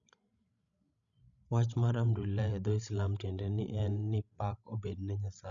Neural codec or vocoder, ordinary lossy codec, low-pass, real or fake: codec, 16 kHz, 16 kbps, FreqCodec, larger model; none; 7.2 kHz; fake